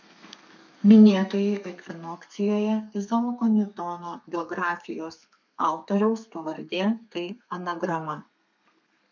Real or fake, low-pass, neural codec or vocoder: fake; 7.2 kHz; codec, 32 kHz, 1.9 kbps, SNAC